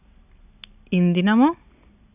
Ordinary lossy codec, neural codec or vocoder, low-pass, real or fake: none; none; 3.6 kHz; real